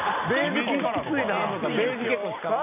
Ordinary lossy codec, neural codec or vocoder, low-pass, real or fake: none; none; 3.6 kHz; real